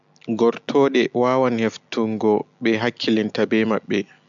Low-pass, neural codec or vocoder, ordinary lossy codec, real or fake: 7.2 kHz; none; MP3, 64 kbps; real